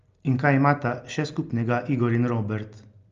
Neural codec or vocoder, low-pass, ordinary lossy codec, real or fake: none; 7.2 kHz; Opus, 32 kbps; real